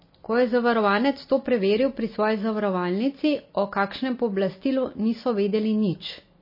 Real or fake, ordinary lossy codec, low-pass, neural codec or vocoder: real; MP3, 24 kbps; 5.4 kHz; none